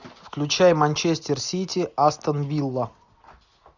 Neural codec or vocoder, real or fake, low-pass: none; real; 7.2 kHz